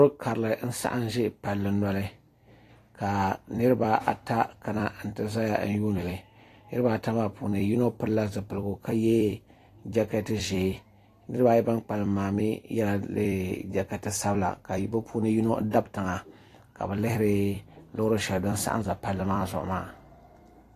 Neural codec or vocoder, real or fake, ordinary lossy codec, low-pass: vocoder, 44.1 kHz, 128 mel bands every 256 samples, BigVGAN v2; fake; AAC, 48 kbps; 14.4 kHz